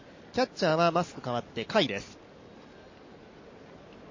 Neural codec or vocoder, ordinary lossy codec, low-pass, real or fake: none; MP3, 32 kbps; 7.2 kHz; real